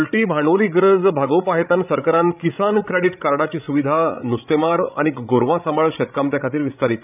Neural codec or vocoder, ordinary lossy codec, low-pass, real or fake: codec, 16 kHz, 16 kbps, FreqCodec, larger model; none; 3.6 kHz; fake